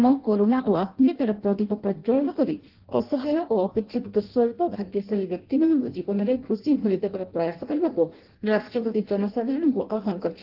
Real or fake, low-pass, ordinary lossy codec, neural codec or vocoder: fake; 5.4 kHz; Opus, 16 kbps; codec, 16 kHz in and 24 kHz out, 0.6 kbps, FireRedTTS-2 codec